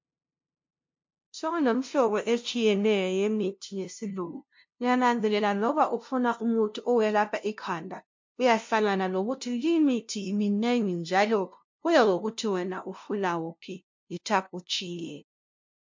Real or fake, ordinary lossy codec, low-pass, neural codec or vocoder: fake; MP3, 48 kbps; 7.2 kHz; codec, 16 kHz, 0.5 kbps, FunCodec, trained on LibriTTS, 25 frames a second